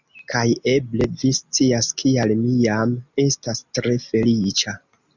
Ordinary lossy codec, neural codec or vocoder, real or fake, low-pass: Opus, 64 kbps; none; real; 7.2 kHz